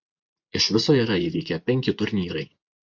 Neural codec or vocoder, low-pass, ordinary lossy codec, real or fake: vocoder, 24 kHz, 100 mel bands, Vocos; 7.2 kHz; MP3, 64 kbps; fake